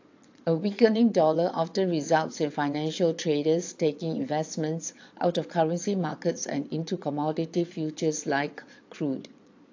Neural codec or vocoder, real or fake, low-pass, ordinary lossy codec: vocoder, 22.05 kHz, 80 mel bands, Vocos; fake; 7.2 kHz; AAC, 48 kbps